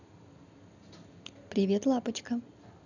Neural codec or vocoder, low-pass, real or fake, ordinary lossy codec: none; 7.2 kHz; real; none